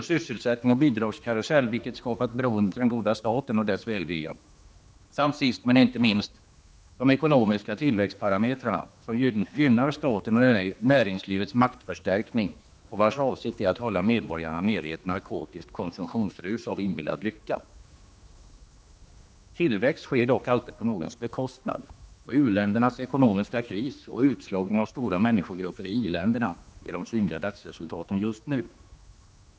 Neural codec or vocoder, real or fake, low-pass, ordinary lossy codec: codec, 16 kHz, 2 kbps, X-Codec, HuBERT features, trained on general audio; fake; none; none